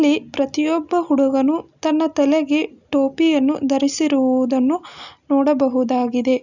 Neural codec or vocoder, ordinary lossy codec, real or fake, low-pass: none; none; real; 7.2 kHz